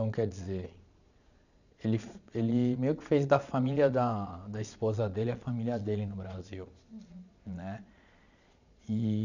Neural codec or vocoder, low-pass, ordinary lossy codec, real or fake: vocoder, 22.05 kHz, 80 mel bands, Vocos; 7.2 kHz; none; fake